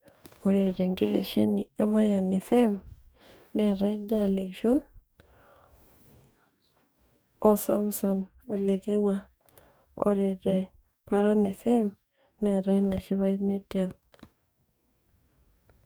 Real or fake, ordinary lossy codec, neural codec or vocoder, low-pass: fake; none; codec, 44.1 kHz, 2.6 kbps, DAC; none